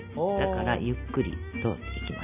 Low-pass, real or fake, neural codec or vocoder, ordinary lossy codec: 3.6 kHz; real; none; none